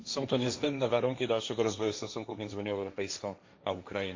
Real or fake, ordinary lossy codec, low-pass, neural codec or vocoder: fake; MP3, 48 kbps; 7.2 kHz; codec, 16 kHz, 1.1 kbps, Voila-Tokenizer